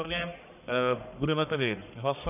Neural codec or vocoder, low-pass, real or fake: codec, 44.1 kHz, 1.7 kbps, Pupu-Codec; 3.6 kHz; fake